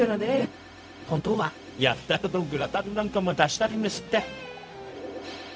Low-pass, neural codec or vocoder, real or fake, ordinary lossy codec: none; codec, 16 kHz, 0.4 kbps, LongCat-Audio-Codec; fake; none